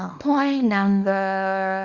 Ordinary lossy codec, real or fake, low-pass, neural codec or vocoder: Opus, 64 kbps; fake; 7.2 kHz; codec, 24 kHz, 0.9 kbps, WavTokenizer, small release